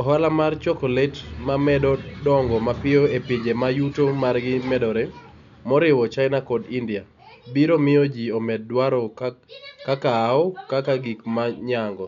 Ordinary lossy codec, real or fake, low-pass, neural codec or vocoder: none; real; 7.2 kHz; none